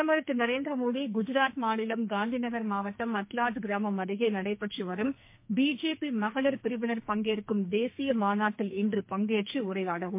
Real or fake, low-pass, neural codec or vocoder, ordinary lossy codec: fake; 3.6 kHz; codec, 44.1 kHz, 2.6 kbps, SNAC; MP3, 24 kbps